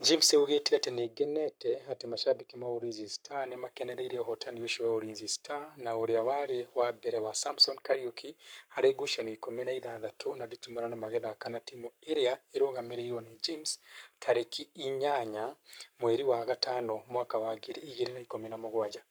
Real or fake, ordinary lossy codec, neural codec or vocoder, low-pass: fake; none; codec, 44.1 kHz, 7.8 kbps, Pupu-Codec; none